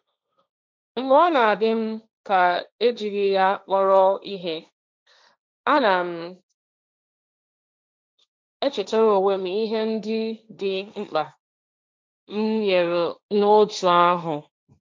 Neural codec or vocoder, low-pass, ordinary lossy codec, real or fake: codec, 16 kHz, 1.1 kbps, Voila-Tokenizer; none; none; fake